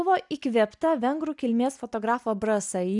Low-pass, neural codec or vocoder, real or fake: 10.8 kHz; none; real